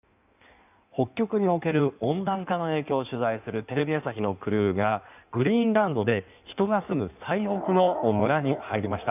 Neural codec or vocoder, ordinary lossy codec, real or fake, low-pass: codec, 16 kHz in and 24 kHz out, 1.1 kbps, FireRedTTS-2 codec; none; fake; 3.6 kHz